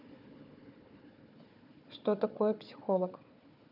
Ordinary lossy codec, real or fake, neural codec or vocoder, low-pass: none; fake; codec, 16 kHz, 4 kbps, FunCodec, trained on Chinese and English, 50 frames a second; 5.4 kHz